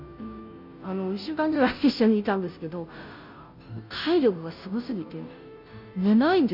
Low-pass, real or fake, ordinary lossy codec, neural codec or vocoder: 5.4 kHz; fake; none; codec, 16 kHz, 0.5 kbps, FunCodec, trained on Chinese and English, 25 frames a second